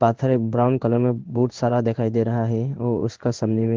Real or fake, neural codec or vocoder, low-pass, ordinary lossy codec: fake; codec, 16 kHz in and 24 kHz out, 1 kbps, XY-Tokenizer; 7.2 kHz; Opus, 16 kbps